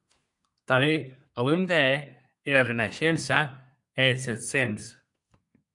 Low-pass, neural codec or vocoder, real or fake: 10.8 kHz; codec, 24 kHz, 1 kbps, SNAC; fake